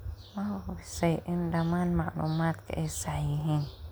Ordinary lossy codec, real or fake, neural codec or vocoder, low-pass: none; real; none; none